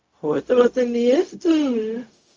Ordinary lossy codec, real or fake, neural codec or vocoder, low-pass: Opus, 24 kbps; fake; codec, 16 kHz, 0.4 kbps, LongCat-Audio-Codec; 7.2 kHz